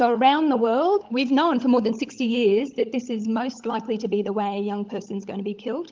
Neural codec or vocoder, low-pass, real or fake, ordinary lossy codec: codec, 16 kHz, 16 kbps, FunCodec, trained on LibriTTS, 50 frames a second; 7.2 kHz; fake; Opus, 32 kbps